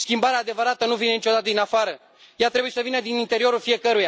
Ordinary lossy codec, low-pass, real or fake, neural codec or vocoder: none; none; real; none